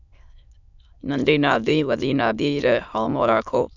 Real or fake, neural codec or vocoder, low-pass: fake; autoencoder, 22.05 kHz, a latent of 192 numbers a frame, VITS, trained on many speakers; 7.2 kHz